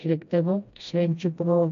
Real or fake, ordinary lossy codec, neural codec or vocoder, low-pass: fake; MP3, 64 kbps; codec, 16 kHz, 1 kbps, FreqCodec, smaller model; 7.2 kHz